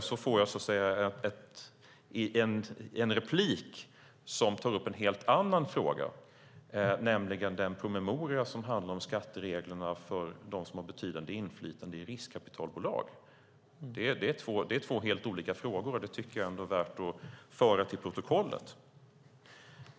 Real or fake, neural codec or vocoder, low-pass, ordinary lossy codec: real; none; none; none